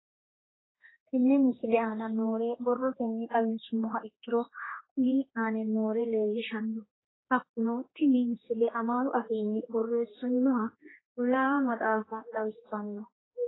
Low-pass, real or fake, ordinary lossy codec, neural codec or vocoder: 7.2 kHz; fake; AAC, 16 kbps; codec, 16 kHz, 2 kbps, X-Codec, HuBERT features, trained on general audio